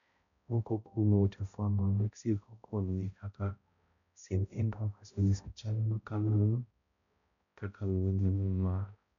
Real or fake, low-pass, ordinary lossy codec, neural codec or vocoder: fake; 7.2 kHz; none; codec, 16 kHz, 0.5 kbps, X-Codec, HuBERT features, trained on balanced general audio